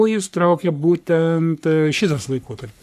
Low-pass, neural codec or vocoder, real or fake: 14.4 kHz; codec, 44.1 kHz, 3.4 kbps, Pupu-Codec; fake